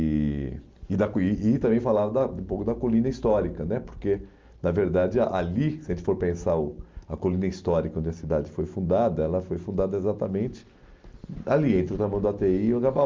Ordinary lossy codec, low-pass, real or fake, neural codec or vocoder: Opus, 32 kbps; 7.2 kHz; real; none